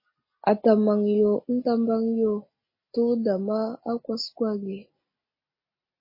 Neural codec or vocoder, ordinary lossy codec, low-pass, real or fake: none; MP3, 24 kbps; 5.4 kHz; real